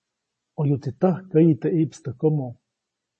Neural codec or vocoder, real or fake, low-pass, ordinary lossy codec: none; real; 10.8 kHz; MP3, 32 kbps